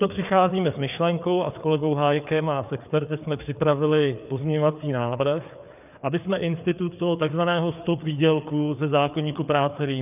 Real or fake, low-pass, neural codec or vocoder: fake; 3.6 kHz; codec, 16 kHz, 4 kbps, FreqCodec, larger model